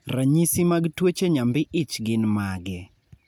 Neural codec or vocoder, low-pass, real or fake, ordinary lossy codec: none; none; real; none